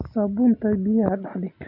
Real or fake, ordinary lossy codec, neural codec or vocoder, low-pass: real; AAC, 48 kbps; none; 5.4 kHz